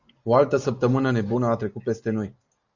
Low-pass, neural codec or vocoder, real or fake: 7.2 kHz; none; real